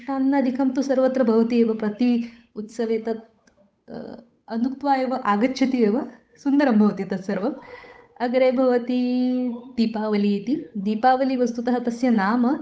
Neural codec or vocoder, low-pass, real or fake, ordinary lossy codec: codec, 16 kHz, 8 kbps, FunCodec, trained on Chinese and English, 25 frames a second; none; fake; none